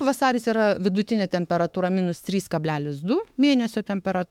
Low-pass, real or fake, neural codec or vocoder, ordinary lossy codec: 19.8 kHz; fake; autoencoder, 48 kHz, 32 numbers a frame, DAC-VAE, trained on Japanese speech; MP3, 96 kbps